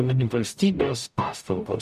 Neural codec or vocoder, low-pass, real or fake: codec, 44.1 kHz, 0.9 kbps, DAC; 14.4 kHz; fake